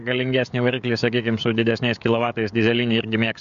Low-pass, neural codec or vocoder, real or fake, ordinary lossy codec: 7.2 kHz; codec, 16 kHz, 16 kbps, FreqCodec, smaller model; fake; MP3, 64 kbps